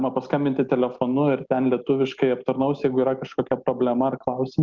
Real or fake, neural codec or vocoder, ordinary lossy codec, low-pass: real; none; Opus, 24 kbps; 7.2 kHz